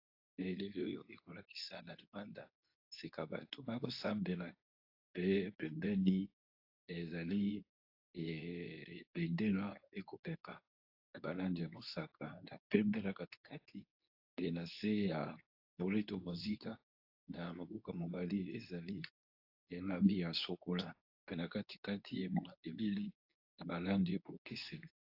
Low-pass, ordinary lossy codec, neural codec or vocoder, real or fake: 5.4 kHz; AAC, 32 kbps; codec, 24 kHz, 0.9 kbps, WavTokenizer, medium speech release version 2; fake